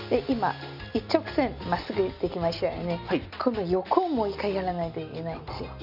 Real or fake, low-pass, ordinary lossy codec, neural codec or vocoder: real; 5.4 kHz; none; none